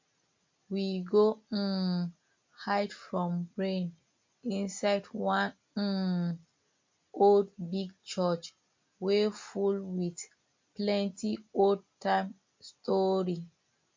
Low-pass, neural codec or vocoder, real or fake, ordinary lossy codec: 7.2 kHz; none; real; MP3, 48 kbps